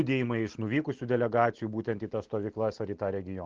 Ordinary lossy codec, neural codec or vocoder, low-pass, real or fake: Opus, 16 kbps; none; 7.2 kHz; real